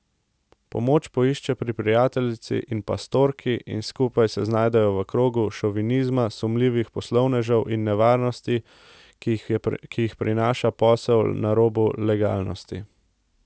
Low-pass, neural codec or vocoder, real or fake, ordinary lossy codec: none; none; real; none